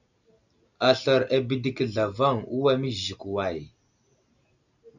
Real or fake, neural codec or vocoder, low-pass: real; none; 7.2 kHz